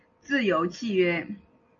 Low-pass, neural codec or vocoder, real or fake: 7.2 kHz; none; real